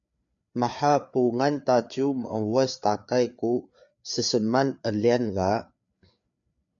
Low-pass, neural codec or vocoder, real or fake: 7.2 kHz; codec, 16 kHz, 4 kbps, FreqCodec, larger model; fake